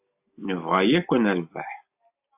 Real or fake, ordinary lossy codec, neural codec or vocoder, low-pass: real; AAC, 32 kbps; none; 3.6 kHz